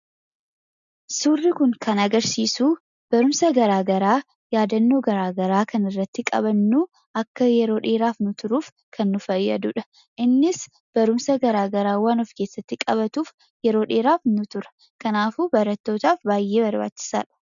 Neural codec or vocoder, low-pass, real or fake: none; 7.2 kHz; real